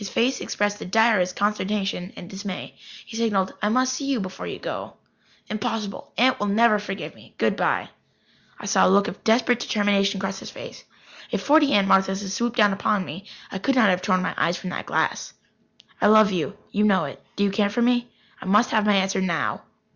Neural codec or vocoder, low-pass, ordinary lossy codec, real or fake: none; 7.2 kHz; Opus, 64 kbps; real